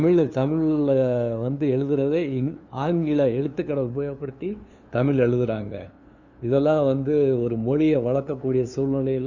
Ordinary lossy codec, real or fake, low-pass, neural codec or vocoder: none; fake; 7.2 kHz; codec, 16 kHz, 2 kbps, FunCodec, trained on LibriTTS, 25 frames a second